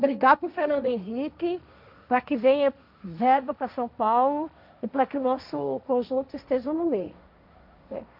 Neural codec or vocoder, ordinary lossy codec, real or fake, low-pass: codec, 16 kHz, 1.1 kbps, Voila-Tokenizer; none; fake; 5.4 kHz